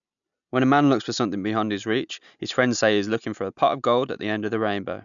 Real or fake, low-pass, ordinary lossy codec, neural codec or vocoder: real; 7.2 kHz; none; none